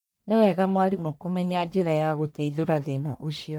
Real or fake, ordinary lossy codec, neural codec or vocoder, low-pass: fake; none; codec, 44.1 kHz, 1.7 kbps, Pupu-Codec; none